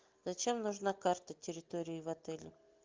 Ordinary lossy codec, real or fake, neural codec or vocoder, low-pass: Opus, 24 kbps; real; none; 7.2 kHz